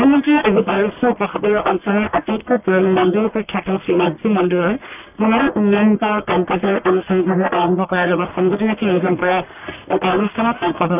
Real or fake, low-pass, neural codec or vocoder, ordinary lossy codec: fake; 3.6 kHz; codec, 44.1 kHz, 1.7 kbps, Pupu-Codec; none